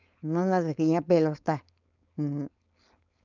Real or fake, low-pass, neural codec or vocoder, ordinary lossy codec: fake; 7.2 kHz; codec, 16 kHz, 4.8 kbps, FACodec; none